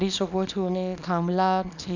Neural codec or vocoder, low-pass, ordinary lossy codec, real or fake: codec, 24 kHz, 0.9 kbps, WavTokenizer, small release; 7.2 kHz; none; fake